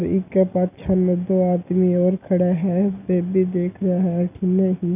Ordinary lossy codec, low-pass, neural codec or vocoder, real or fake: none; 3.6 kHz; none; real